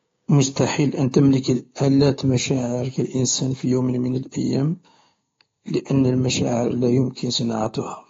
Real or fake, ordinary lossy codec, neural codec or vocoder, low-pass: fake; AAC, 32 kbps; codec, 16 kHz, 6 kbps, DAC; 7.2 kHz